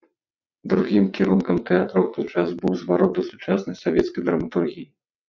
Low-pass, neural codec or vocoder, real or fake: 7.2 kHz; vocoder, 22.05 kHz, 80 mel bands, WaveNeXt; fake